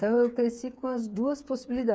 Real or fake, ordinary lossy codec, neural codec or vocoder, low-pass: fake; none; codec, 16 kHz, 8 kbps, FreqCodec, smaller model; none